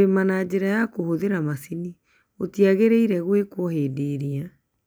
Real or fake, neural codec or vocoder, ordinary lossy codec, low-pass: real; none; none; none